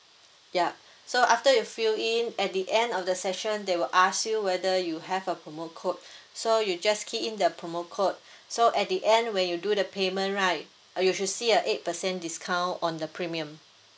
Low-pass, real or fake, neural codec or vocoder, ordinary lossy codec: none; real; none; none